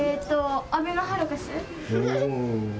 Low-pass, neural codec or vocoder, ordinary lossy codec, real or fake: none; none; none; real